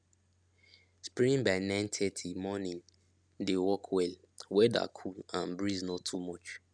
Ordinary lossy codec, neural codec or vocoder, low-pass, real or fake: none; none; 9.9 kHz; real